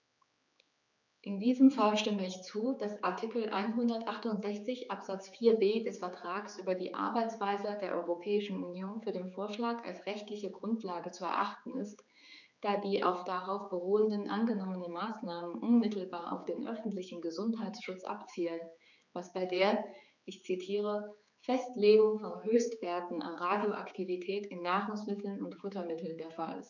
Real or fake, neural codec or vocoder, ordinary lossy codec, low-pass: fake; codec, 16 kHz, 4 kbps, X-Codec, HuBERT features, trained on general audio; none; 7.2 kHz